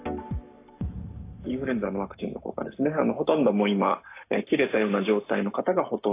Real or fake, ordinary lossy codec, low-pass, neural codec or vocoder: fake; AAC, 24 kbps; 3.6 kHz; codec, 44.1 kHz, 7.8 kbps, Pupu-Codec